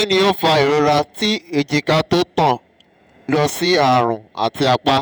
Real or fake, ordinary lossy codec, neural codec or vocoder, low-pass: fake; none; vocoder, 48 kHz, 128 mel bands, Vocos; none